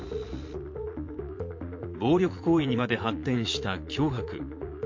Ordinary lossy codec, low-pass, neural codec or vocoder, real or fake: MP3, 48 kbps; 7.2 kHz; vocoder, 44.1 kHz, 80 mel bands, Vocos; fake